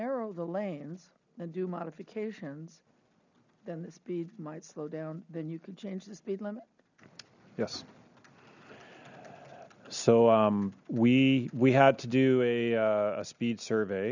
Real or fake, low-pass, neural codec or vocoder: real; 7.2 kHz; none